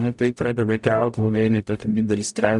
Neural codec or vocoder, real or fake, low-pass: codec, 44.1 kHz, 0.9 kbps, DAC; fake; 10.8 kHz